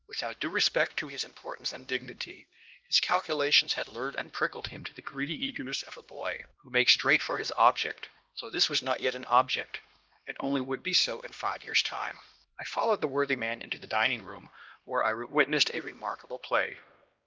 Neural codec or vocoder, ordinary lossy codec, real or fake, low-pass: codec, 16 kHz, 1 kbps, X-Codec, HuBERT features, trained on LibriSpeech; Opus, 32 kbps; fake; 7.2 kHz